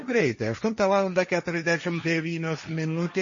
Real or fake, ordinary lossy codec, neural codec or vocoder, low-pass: fake; MP3, 32 kbps; codec, 16 kHz, 1.1 kbps, Voila-Tokenizer; 7.2 kHz